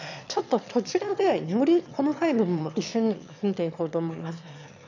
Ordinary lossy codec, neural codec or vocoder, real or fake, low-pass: none; autoencoder, 22.05 kHz, a latent of 192 numbers a frame, VITS, trained on one speaker; fake; 7.2 kHz